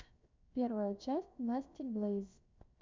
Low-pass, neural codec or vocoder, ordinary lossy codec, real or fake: 7.2 kHz; codec, 16 kHz, 0.7 kbps, FocalCodec; MP3, 64 kbps; fake